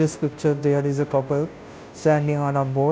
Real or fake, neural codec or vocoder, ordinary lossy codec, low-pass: fake; codec, 16 kHz, 0.5 kbps, FunCodec, trained on Chinese and English, 25 frames a second; none; none